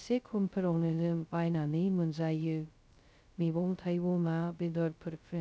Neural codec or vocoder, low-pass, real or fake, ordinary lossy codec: codec, 16 kHz, 0.2 kbps, FocalCodec; none; fake; none